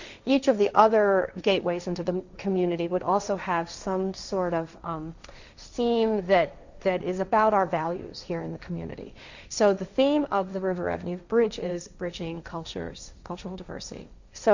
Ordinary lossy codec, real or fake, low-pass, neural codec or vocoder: Opus, 64 kbps; fake; 7.2 kHz; codec, 16 kHz, 1.1 kbps, Voila-Tokenizer